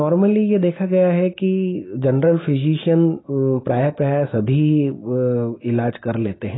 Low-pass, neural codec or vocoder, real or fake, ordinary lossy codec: 7.2 kHz; none; real; AAC, 16 kbps